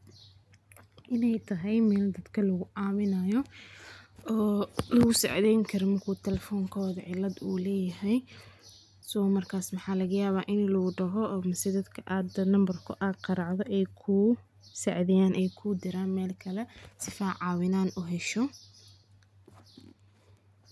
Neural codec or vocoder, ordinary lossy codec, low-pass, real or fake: none; none; none; real